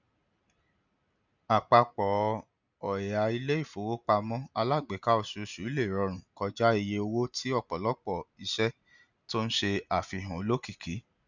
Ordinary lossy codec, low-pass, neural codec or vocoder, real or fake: none; 7.2 kHz; none; real